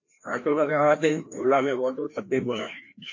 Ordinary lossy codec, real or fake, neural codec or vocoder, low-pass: AAC, 48 kbps; fake; codec, 16 kHz, 1 kbps, FreqCodec, larger model; 7.2 kHz